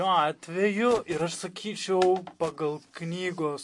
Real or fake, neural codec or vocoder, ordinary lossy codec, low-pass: real; none; MP3, 48 kbps; 10.8 kHz